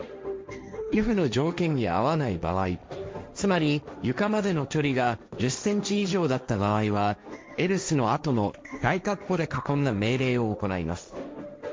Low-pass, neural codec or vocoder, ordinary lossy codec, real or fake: none; codec, 16 kHz, 1.1 kbps, Voila-Tokenizer; none; fake